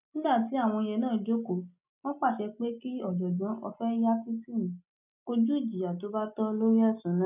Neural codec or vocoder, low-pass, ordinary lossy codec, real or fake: none; 3.6 kHz; none; real